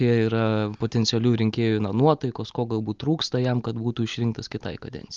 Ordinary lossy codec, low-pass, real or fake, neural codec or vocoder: Opus, 32 kbps; 7.2 kHz; real; none